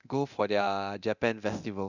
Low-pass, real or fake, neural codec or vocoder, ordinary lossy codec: 7.2 kHz; fake; codec, 24 kHz, 0.9 kbps, DualCodec; none